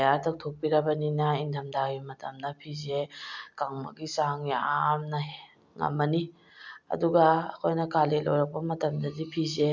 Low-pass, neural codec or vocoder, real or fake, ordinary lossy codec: 7.2 kHz; none; real; none